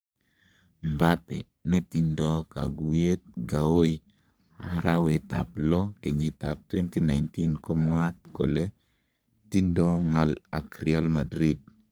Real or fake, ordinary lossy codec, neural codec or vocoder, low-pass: fake; none; codec, 44.1 kHz, 3.4 kbps, Pupu-Codec; none